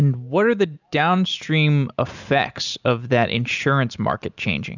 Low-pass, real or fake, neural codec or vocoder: 7.2 kHz; real; none